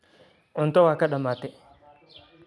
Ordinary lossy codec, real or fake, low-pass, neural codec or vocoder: none; real; none; none